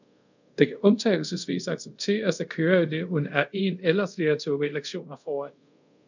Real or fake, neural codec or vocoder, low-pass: fake; codec, 24 kHz, 0.5 kbps, DualCodec; 7.2 kHz